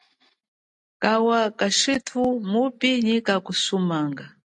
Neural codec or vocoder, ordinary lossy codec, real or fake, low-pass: none; AAC, 64 kbps; real; 10.8 kHz